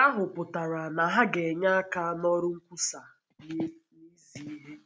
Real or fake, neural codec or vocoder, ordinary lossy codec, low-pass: real; none; none; none